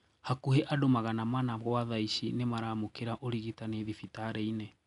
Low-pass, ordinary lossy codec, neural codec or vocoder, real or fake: 10.8 kHz; none; none; real